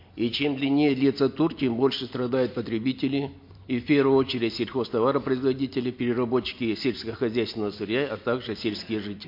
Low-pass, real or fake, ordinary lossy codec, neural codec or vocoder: 5.4 kHz; real; MP3, 32 kbps; none